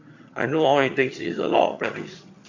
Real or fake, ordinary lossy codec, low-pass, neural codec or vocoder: fake; AAC, 48 kbps; 7.2 kHz; vocoder, 22.05 kHz, 80 mel bands, HiFi-GAN